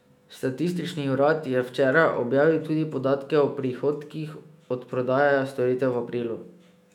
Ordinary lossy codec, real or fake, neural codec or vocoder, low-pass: none; fake; autoencoder, 48 kHz, 128 numbers a frame, DAC-VAE, trained on Japanese speech; 19.8 kHz